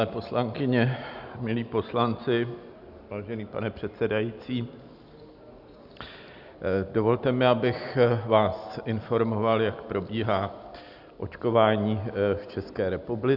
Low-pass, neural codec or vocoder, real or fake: 5.4 kHz; none; real